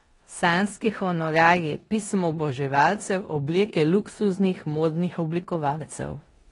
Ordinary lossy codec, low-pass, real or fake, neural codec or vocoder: AAC, 32 kbps; 10.8 kHz; fake; codec, 16 kHz in and 24 kHz out, 0.9 kbps, LongCat-Audio-Codec, four codebook decoder